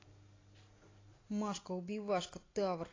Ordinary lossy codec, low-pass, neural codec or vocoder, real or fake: AAC, 32 kbps; 7.2 kHz; none; real